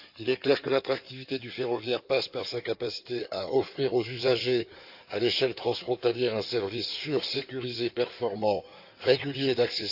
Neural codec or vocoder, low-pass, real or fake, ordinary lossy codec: codec, 16 kHz in and 24 kHz out, 2.2 kbps, FireRedTTS-2 codec; 5.4 kHz; fake; AAC, 48 kbps